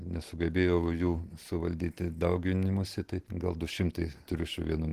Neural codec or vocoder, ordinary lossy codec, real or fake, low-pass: none; Opus, 16 kbps; real; 14.4 kHz